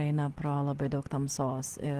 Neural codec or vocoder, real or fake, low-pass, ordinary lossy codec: none; real; 14.4 kHz; Opus, 16 kbps